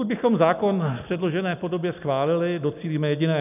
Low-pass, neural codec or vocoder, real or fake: 3.6 kHz; none; real